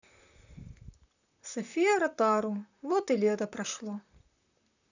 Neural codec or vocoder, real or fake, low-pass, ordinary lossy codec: vocoder, 44.1 kHz, 128 mel bands, Pupu-Vocoder; fake; 7.2 kHz; none